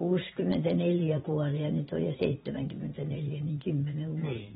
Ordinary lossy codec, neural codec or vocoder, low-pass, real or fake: AAC, 16 kbps; vocoder, 22.05 kHz, 80 mel bands, Vocos; 9.9 kHz; fake